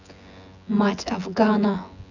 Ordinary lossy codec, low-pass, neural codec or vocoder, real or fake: none; 7.2 kHz; vocoder, 24 kHz, 100 mel bands, Vocos; fake